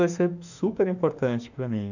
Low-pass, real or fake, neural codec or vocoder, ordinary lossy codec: 7.2 kHz; fake; autoencoder, 48 kHz, 32 numbers a frame, DAC-VAE, trained on Japanese speech; none